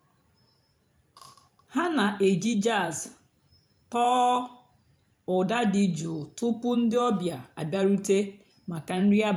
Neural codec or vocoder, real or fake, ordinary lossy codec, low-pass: vocoder, 44.1 kHz, 128 mel bands every 512 samples, BigVGAN v2; fake; none; 19.8 kHz